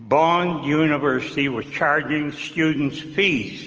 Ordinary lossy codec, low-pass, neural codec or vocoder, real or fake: Opus, 24 kbps; 7.2 kHz; none; real